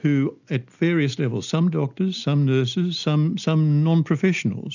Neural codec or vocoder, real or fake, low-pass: none; real; 7.2 kHz